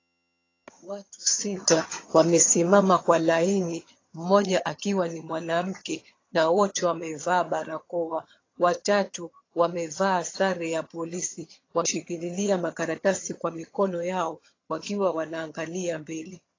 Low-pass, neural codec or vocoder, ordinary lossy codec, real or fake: 7.2 kHz; vocoder, 22.05 kHz, 80 mel bands, HiFi-GAN; AAC, 32 kbps; fake